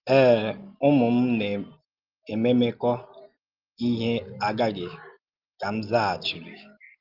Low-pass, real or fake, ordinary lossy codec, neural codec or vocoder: 5.4 kHz; real; Opus, 32 kbps; none